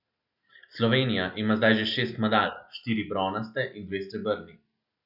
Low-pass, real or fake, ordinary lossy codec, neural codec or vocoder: 5.4 kHz; real; none; none